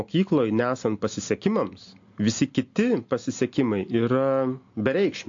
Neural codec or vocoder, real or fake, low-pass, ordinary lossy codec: none; real; 7.2 kHz; AAC, 48 kbps